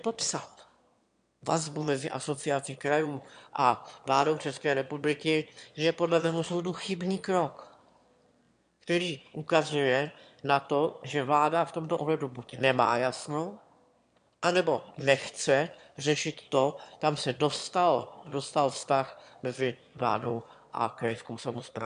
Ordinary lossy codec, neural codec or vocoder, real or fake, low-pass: MP3, 64 kbps; autoencoder, 22.05 kHz, a latent of 192 numbers a frame, VITS, trained on one speaker; fake; 9.9 kHz